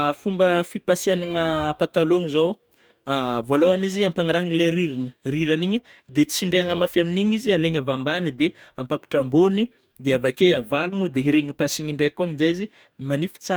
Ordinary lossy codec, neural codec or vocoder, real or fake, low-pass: none; codec, 44.1 kHz, 2.6 kbps, DAC; fake; none